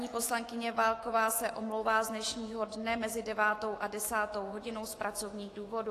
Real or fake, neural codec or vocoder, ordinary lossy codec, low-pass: fake; autoencoder, 48 kHz, 128 numbers a frame, DAC-VAE, trained on Japanese speech; AAC, 48 kbps; 14.4 kHz